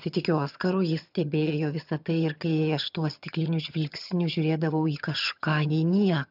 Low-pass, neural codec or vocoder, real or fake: 5.4 kHz; vocoder, 22.05 kHz, 80 mel bands, HiFi-GAN; fake